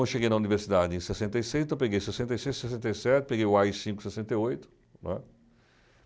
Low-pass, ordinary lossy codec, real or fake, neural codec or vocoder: none; none; real; none